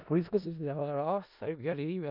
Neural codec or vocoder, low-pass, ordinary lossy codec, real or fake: codec, 16 kHz in and 24 kHz out, 0.4 kbps, LongCat-Audio-Codec, four codebook decoder; 5.4 kHz; none; fake